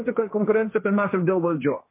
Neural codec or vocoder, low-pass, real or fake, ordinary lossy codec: codec, 16 kHz, 1.1 kbps, Voila-Tokenizer; 3.6 kHz; fake; MP3, 24 kbps